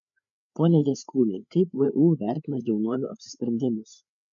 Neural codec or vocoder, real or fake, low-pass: codec, 16 kHz, 4 kbps, FreqCodec, larger model; fake; 7.2 kHz